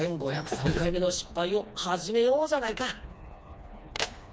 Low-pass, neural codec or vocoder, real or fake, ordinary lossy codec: none; codec, 16 kHz, 2 kbps, FreqCodec, smaller model; fake; none